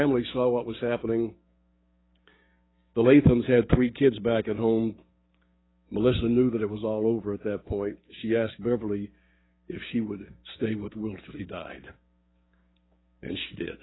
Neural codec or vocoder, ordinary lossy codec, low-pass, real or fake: codec, 16 kHz, 6 kbps, DAC; AAC, 16 kbps; 7.2 kHz; fake